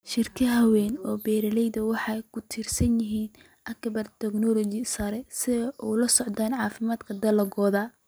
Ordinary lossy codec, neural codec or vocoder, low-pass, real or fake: none; none; none; real